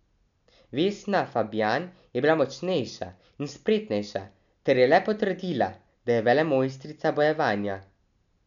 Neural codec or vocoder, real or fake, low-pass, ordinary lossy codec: none; real; 7.2 kHz; MP3, 96 kbps